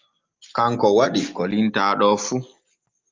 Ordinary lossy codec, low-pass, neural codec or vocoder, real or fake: Opus, 24 kbps; 7.2 kHz; none; real